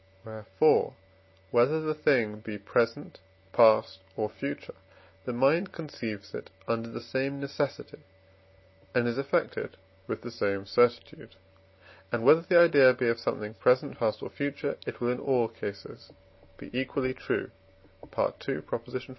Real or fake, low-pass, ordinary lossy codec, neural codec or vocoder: real; 7.2 kHz; MP3, 24 kbps; none